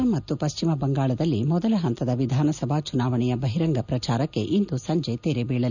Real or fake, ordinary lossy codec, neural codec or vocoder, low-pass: real; none; none; 7.2 kHz